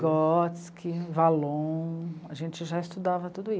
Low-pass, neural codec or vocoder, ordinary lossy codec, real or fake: none; none; none; real